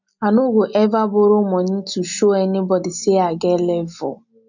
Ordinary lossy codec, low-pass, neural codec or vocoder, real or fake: none; 7.2 kHz; none; real